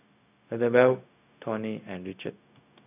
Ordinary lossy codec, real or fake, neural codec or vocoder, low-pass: none; fake; codec, 16 kHz, 0.4 kbps, LongCat-Audio-Codec; 3.6 kHz